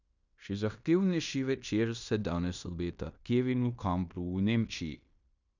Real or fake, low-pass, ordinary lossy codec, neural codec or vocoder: fake; 7.2 kHz; none; codec, 16 kHz in and 24 kHz out, 0.9 kbps, LongCat-Audio-Codec, fine tuned four codebook decoder